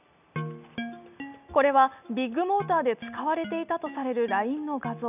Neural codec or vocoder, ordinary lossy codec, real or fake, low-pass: none; none; real; 3.6 kHz